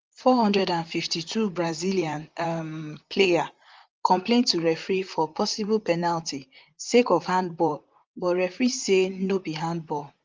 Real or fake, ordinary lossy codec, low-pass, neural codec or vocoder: fake; Opus, 32 kbps; 7.2 kHz; vocoder, 44.1 kHz, 128 mel bands, Pupu-Vocoder